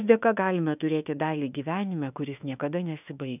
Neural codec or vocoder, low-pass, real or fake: autoencoder, 48 kHz, 32 numbers a frame, DAC-VAE, trained on Japanese speech; 3.6 kHz; fake